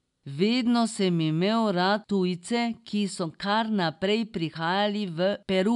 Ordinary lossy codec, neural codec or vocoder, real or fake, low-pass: none; none; real; 10.8 kHz